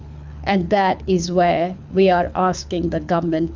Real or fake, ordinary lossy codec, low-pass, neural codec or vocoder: fake; MP3, 64 kbps; 7.2 kHz; codec, 24 kHz, 6 kbps, HILCodec